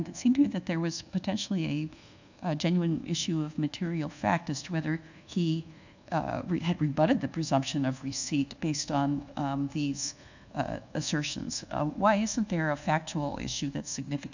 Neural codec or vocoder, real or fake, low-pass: codec, 24 kHz, 1.2 kbps, DualCodec; fake; 7.2 kHz